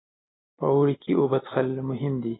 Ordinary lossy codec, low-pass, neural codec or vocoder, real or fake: AAC, 16 kbps; 7.2 kHz; none; real